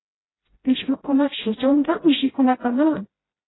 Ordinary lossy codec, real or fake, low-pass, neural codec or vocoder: AAC, 16 kbps; fake; 7.2 kHz; codec, 16 kHz, 0.5 kbps, FreqCodec, smaller model